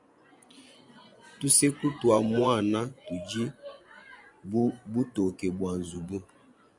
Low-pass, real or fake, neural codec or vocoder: 10.8 kHz; fake; vocoder, 24 kHz, 100 mel bands, Vocos